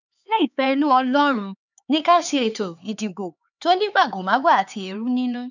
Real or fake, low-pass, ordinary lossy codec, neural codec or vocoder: fake; 7.2 kHz; none; codec, 16 kHz, 4 kbps, X-Codec, HuBERT features, trained on LibriSpeech